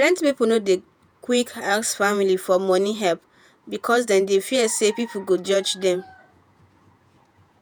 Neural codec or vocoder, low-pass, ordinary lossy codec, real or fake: vocoder, 48 kHz, 128 mel bands, Vocos; none; none; fake